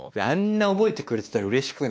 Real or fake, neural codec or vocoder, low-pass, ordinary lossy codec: fake; codec, 16 kHz, 2 kbps, X-Codec, WavLM features, trained on Multilingual LibriSpeech; none; none